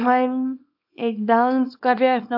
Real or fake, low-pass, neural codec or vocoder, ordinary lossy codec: fake; 5.4 kHz; codec, 24 kHz, 0.9 kbps, WavTokenizer, small release; AAC, 48 kbps